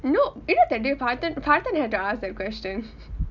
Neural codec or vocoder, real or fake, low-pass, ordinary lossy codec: none; real; 7.2 kHz; none